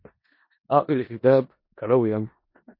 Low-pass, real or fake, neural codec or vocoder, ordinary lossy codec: 5.4 kHz; fake; codec, 16 kHz in and 24 kHz out, 0.4 kbps, LongCat-Audio-Codec, four codebook decoder; MP3, 32 kbps